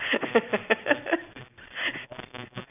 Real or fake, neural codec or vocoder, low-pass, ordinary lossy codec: real; none; 3.6 kHz; none